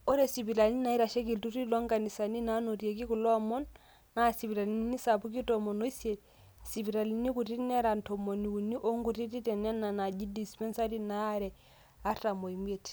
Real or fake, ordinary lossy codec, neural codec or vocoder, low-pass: real; none; none; none